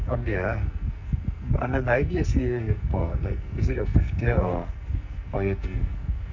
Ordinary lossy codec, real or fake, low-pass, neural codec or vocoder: none; fake; 7.2 kHz; codec, 44.1 kHz, 2.6 kbps, SNAC